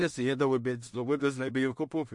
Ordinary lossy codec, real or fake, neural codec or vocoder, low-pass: MP3, 48 kbps; fake; codec, 16 kHz in and 24 kHz out, 0.4 kbps, LongCat-Audio-Codec, two codebook decoder; 10.8 kHz